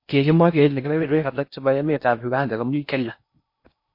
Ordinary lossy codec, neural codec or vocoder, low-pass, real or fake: MP3, 32 kbps; codec, 16 kHz in and 24 kHz out, 0.6 kbps, FocalCodec, streaming, 4096 codes; 5.4 kHz; fake